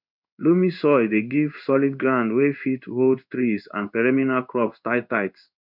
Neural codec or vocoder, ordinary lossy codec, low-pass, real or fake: codec, 16 kHz in and 24 kHz out, 1 kbps, XY-Tokenizer; none; 5.4 kHz; fake